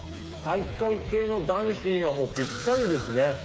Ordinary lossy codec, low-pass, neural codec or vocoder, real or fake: none; none; codec, 16 kHz, 4 kbps, FreqCodec, smaller model; fake